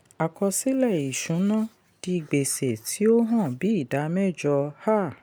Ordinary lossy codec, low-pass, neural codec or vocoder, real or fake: none; none; none; real